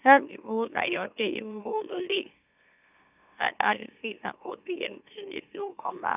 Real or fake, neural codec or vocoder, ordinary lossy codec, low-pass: fake; autoencoder, 44.1 kHz, a latent of 192 numbers a frame, MeloTTS; none; 3.6 kHz